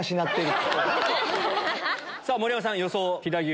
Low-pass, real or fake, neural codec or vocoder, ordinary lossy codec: none; real; none; none